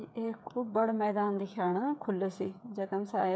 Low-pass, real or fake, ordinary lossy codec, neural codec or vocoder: none; fake; none; codec, 16 kHz, 8 kbps, FreqCodec, smaller model